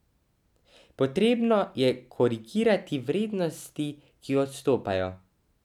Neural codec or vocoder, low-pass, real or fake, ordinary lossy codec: none; 19.8 kHz; real; none